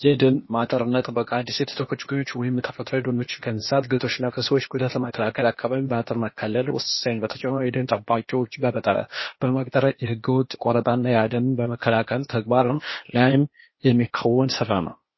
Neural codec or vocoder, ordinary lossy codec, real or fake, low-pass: codec, 16 kHz, 0.8 kbps, ZipCodec; MP3, 24 kbps; fake; 7.2 kHz